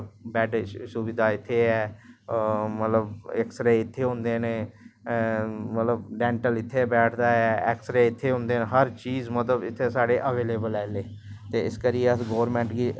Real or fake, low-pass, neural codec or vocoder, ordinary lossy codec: real; none; none; none